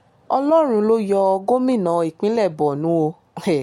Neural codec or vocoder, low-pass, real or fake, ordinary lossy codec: none; 19.8 kHz; real; MP3, 64 kbps